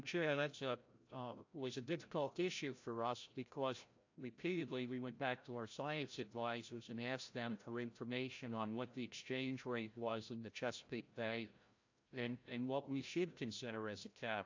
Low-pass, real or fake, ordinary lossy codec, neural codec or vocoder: 7.2 kHz; fake; AAC, 48 kbps; codec, 16 kHz, 0.5 kbps, FreqCodec, larger model